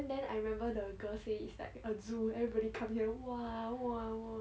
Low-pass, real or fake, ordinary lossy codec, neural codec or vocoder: none; real; none; none